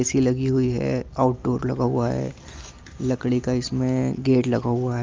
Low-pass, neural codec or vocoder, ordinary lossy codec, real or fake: 7.2 kHz; none; Opus, 32 kbps; real